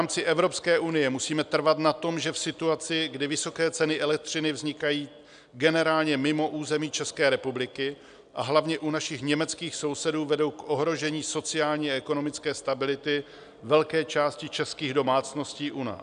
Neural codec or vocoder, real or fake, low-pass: none; real; 9.9 kHz